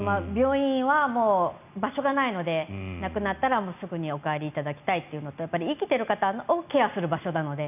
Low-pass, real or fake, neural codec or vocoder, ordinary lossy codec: 3.6 kHz; real; none; none